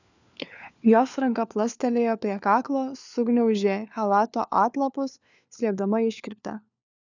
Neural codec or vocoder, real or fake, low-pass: codec, 16 kHz, 4 kbps, FunCodec, trained on LibriTTS, 50 frames a second; fake; 7.2 kHz